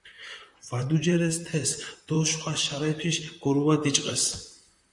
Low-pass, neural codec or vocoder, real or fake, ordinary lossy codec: 10.8 kHz; vocoder, 44.1 kHz, 128 mel bands, Pupu-Vocoder; fake; MP3, 96 kbps